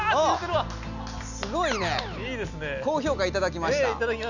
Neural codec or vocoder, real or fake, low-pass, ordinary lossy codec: none; real; 7.2 kHz; none